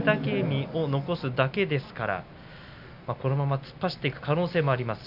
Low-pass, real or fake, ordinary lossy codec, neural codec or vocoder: 5.4 kHz; real; none; none